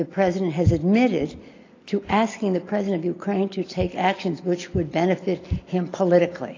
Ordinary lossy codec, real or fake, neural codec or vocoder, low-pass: AAC, 32 kbps; real; none; 7.2 kHz